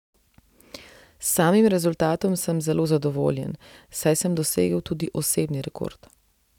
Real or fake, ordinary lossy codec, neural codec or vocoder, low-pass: real; none; none; 19.8 kHz